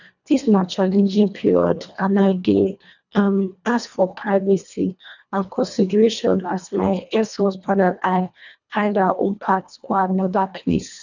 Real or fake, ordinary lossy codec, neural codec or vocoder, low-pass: fake; none; codec, 24 kHz, 1.5 kbps, HILCodec; 7.2 kHz